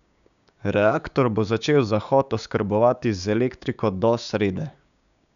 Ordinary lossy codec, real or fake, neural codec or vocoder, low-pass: none; fake; codec, 16 kHz, 6 kbps, DAC; 7.2 kHz